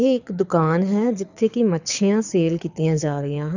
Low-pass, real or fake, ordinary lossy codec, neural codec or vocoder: 7.2 kHz; fake; none; codec, 24 kHz, 6 kbps, HILCodec